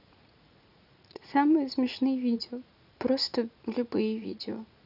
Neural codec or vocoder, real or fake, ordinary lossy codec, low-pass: vocoder, 44.1 kHz, 80 mel bands, Vocos; fake; none; 5.4 kHz